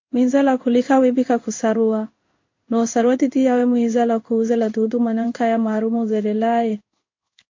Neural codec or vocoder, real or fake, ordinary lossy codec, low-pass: codec, 16 kHz in and 24 kHz out, 1 kbps, XY-Tokenizer; fake; MP3, 48 kbps; 7.2 kHz